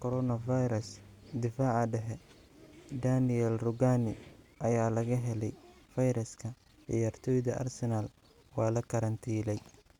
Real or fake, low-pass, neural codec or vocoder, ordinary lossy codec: real; 19.8 kHz; none; Opus, 64 kbps